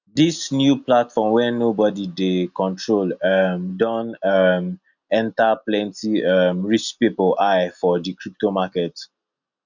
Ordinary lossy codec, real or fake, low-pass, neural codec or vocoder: none; real; 7.2 kHz; none